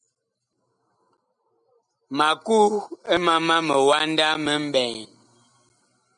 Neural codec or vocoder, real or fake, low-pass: none; real; 9.9 kHz